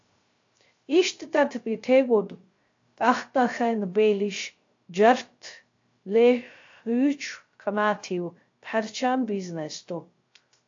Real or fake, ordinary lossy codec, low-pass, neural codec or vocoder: fake; AAC, 48 kbps; 7.2 kHz; codec, 16 kHz, 0.3 kbps, FocalCodec